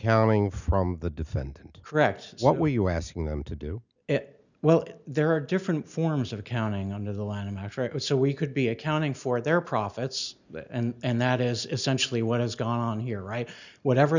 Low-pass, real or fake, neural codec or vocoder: 7.2 kHz; real; none